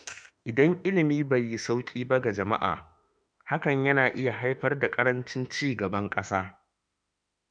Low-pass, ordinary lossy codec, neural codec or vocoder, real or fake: 9.9 kHz; none; autoencoder, 48 kHz, 32 numbers a frame, DAC-VAE, trained on Japanese speech; fake